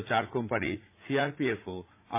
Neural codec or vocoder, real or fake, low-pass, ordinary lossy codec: vocoder, 44.1 kHz, 80 mel bands, Vocos; fake; 3.6 kHz; MP3, 24 kbps